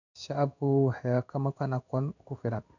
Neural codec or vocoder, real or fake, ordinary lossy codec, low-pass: codec, 16 kHz in and 24 kHz out, 1 kbps, XY-Tokenizer; fake; none; 7.2 kHz